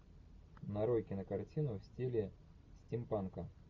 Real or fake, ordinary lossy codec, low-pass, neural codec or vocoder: real; MP3, 48 kbps; 7.2 kHz; none